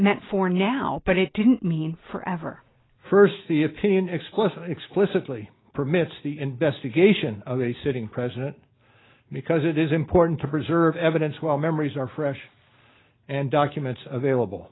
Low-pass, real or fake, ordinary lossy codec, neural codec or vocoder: 7.2 kHz; real; AAC, 16 kbps; none